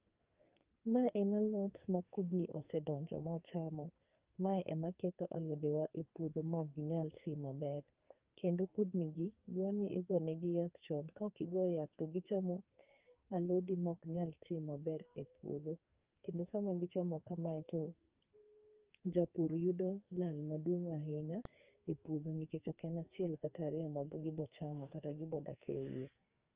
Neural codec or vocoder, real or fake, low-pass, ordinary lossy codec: codec, 44.1 kHz, 2.6 kbps, SNAC; fake; 3.6 kHz; Opus, 24 kbps